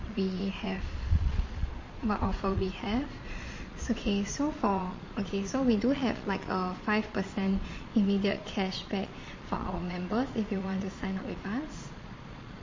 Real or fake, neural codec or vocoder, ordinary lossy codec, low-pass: fake; vocoder, 22.05 kHz, 80 mel bands, Vocos; MP3, 32 kbps; 7.2 kHz